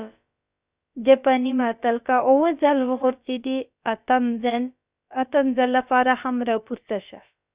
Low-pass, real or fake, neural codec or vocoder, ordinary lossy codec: 3.6 kHz; fake; codec, 16 kHz, about 1 kbps, DyCAST, with the encoder's durations; Opus, 64 kbps